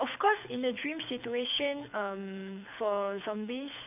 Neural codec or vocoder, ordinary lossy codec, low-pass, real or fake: codec, 24 kHz, 6 kbps, HILCodec; none; 3.6 kHz; fake